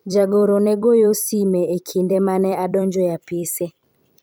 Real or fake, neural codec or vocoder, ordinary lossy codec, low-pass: fake; vocoder, 44.1 kHz, 128 mel bands every 256 samples, BigVGAN v2; none; none